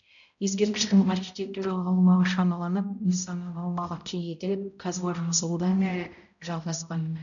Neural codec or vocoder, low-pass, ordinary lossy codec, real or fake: codec, 16 kHz, 0.5 kbps, X-Codec, HuBERT features, trained on balanced general audio; 7.2 kHz; none; fake